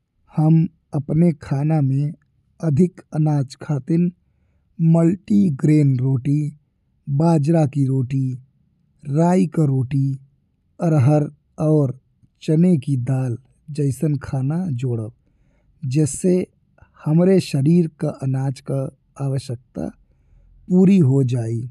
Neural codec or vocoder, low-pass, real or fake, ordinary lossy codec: none; 14.4 kHz; real; none